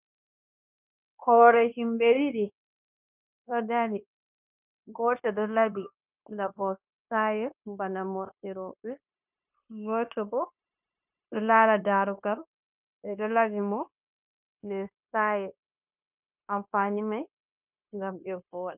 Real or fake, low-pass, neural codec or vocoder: fake; 3.6 kHz; codec, 16 kHz, 0.9 kbps, LongCat-Audio-Codec